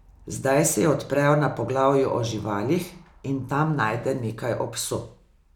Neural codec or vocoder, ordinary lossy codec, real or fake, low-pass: none; none; real; 19.8 kHz